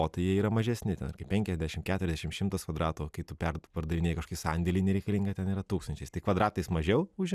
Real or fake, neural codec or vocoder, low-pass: real; none; 14.4 kHz